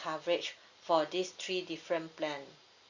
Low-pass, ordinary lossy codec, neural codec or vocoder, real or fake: 7.2 kHz; Opus, 64 kbps; none; real